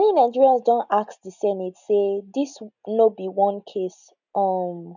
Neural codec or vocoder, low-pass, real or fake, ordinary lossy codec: none; 7.2 kHz; real; none